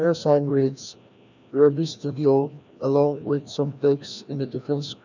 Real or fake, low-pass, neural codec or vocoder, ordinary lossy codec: fake; 7.2 kHz; codec, 16 kHz, 1 kbps, FreqCodec, larger model; none